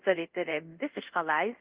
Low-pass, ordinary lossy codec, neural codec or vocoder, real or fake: 3.6 kHz; Opus, 32 kbps; codec, 24 kHz, 0.5 kbps, DualCodec; fake